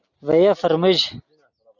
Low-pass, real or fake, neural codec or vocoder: 7.2 kHz; real; none